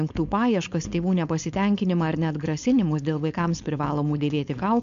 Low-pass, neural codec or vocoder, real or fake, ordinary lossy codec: 7.2 kHz; codec, 16 kHz, 4.8 kbps, FACodec; fake; MP3, 64 kbps